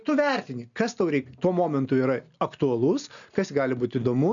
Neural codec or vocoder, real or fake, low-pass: none; real; 7.2 kHz